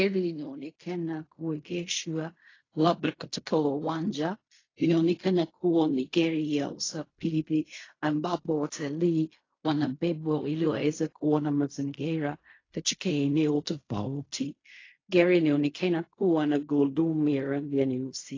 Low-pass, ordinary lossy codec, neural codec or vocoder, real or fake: 7.2 kHz; AAC, 32 kbps; codec, 16 kHz in and 24 kHz out, 0.4 kbps, LongCat-Audio-Codec, fine tuned four codebook decoder; fake